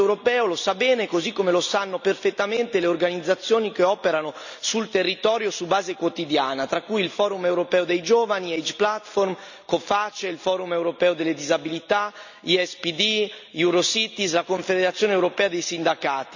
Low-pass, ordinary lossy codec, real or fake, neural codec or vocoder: 7.2 kHz; none; real; none